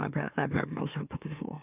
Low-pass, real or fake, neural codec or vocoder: 3.6 kHz; fake; autoencoder, 44.1 kHz, a latent of 192 numbers a frame, MeloTTS